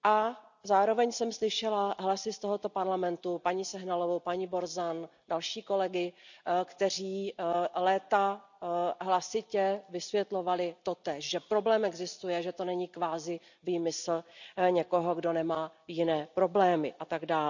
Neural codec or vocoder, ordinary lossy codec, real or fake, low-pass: none; none; real; 7.2 kHz